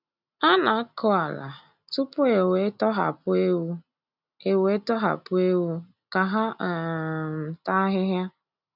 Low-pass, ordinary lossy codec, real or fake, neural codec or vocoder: 5.4 kHz; none; real; none